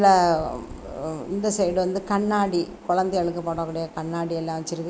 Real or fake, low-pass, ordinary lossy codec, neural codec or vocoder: real; none; none; none